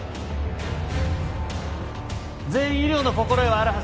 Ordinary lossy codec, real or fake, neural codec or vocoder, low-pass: none; real; none; none